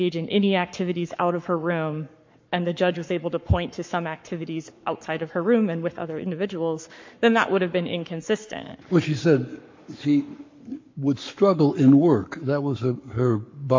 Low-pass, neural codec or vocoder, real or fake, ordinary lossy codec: 7.2 kHz; codec, 44.1 kHz, 7.8 kbps, Pupu-Codec; fake; MP3, 48 kbps